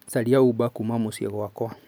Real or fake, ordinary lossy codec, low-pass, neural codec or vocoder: real; none; none; none